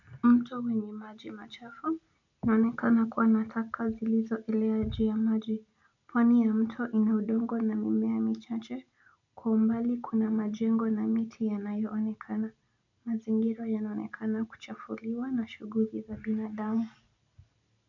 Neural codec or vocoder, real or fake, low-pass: none; real; 7.2 kHz